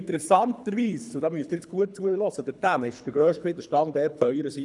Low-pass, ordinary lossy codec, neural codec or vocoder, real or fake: 10.8 kHz; none; codec, 24 kHz, 3 kbps, HILCodec; fake